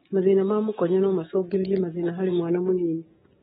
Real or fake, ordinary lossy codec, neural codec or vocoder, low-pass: fake; AAC, 16 kbps; codec, 16 kHz, 6 kbps, DAC; 7.2 kHz